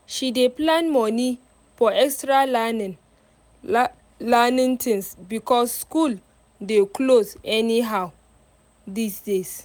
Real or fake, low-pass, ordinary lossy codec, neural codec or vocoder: real; none; none; none